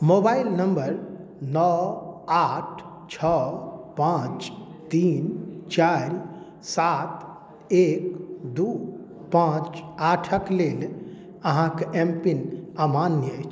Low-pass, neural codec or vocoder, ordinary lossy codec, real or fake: none; none; none; real